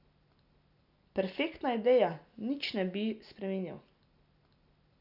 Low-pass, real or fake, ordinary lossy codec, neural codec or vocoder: 5.4 kHz; real; none; none